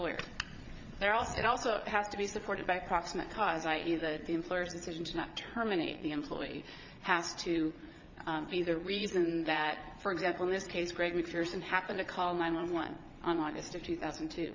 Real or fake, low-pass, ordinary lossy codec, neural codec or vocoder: fake; 7.2 kHz; MP3, 64 kbps; vocoder, 22.05 kHz, 80 mel bands, Vocos